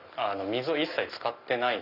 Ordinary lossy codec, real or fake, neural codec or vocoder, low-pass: none; real; none; 5.4 kHz